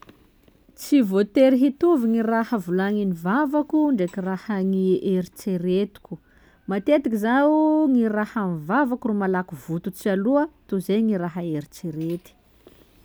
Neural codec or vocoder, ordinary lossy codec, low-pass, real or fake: none; none; none; real